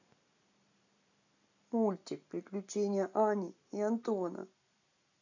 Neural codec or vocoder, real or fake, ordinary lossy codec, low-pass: none; real; none; 7.2 kHz